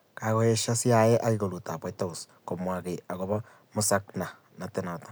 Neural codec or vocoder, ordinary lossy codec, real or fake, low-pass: none; none; real; none